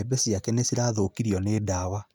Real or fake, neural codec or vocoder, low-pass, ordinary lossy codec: real; none; none; none